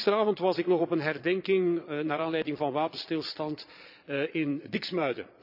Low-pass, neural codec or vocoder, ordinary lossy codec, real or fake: 5.4 kHz; vocoder, 22.05 kHz, 80 mel bands, Vocos; none; fake